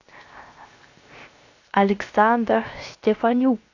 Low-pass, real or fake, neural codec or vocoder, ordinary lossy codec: 7.2 kHz; fake; codec, 16 kHz, 0.7 kbps, FocalCodec; AAC, 48 kbps